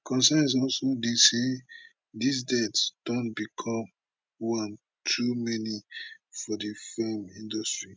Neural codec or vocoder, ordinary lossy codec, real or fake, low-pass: none; none; real; none